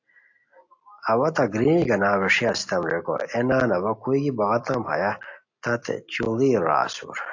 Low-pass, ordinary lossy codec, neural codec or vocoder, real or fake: 7.2 kHz; AAC, 48 kbps; none; real